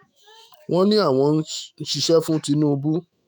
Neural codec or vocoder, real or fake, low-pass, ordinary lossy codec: autoencoder, 48 kHz, 128 numbers a frame, DAC-VAE, trained on Japanese speech; fake; none; none